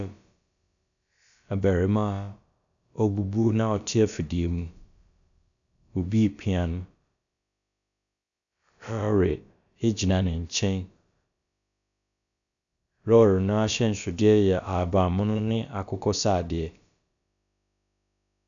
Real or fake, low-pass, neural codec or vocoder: fake; 7.2 kHz; codec, 16 kHz, about 1 kbps, DyCAST, with the encoder's durations